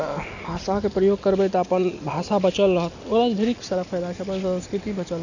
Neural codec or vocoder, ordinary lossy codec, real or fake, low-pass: none; none; real; 7.2 kHz